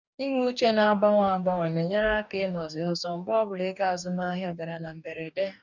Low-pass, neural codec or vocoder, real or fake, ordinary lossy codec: 7.2 kHz; codec, 44.1 kHz, 2.6 kbps, DAC; fake; none